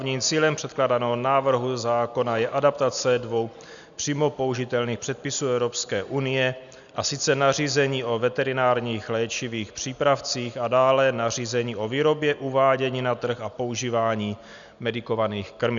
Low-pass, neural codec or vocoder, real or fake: 7.2 kHz; none; real